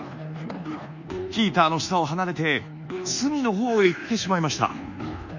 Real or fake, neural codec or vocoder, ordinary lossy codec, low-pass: fake; codec, 24 kHz, 1.2 kbps, DualCodec; none; 7.2 kHz